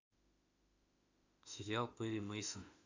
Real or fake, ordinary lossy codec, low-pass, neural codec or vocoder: fake; AAC, 48 kbps; 7.2 kHz; autoencoder, 48 kHz, 32 numbers a frame, DAC-VAE, trained on Japanese speech